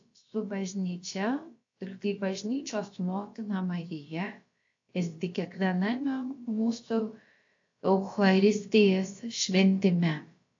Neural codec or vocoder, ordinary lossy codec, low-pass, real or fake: codec, 16 kHz, about 1 kbps, DyCAST, with the encoder's durations; AAC, 48 kbps; 7.2 kHz; fake